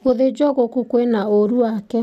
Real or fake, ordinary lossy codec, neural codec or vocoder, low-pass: real; none; none; 14.4 kHz